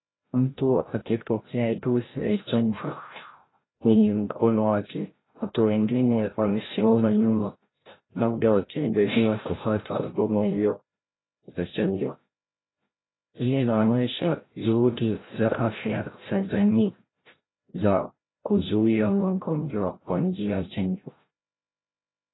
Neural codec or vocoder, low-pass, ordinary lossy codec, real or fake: codec, 16 kHz, 0.5 kbps, FreqCodec, larger model; 7.2 kHz; AAC, 16 kbps; fake